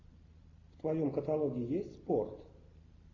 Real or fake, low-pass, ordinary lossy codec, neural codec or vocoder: real; 7.2 kHz; AAC, 32 kbps; none